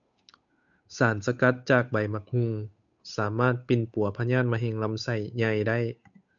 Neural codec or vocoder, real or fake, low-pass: codec, 16 kHz, 8 kbps, FunCodec, trained on Chinese and English, 25 frames a second; fake; 7.2 kHz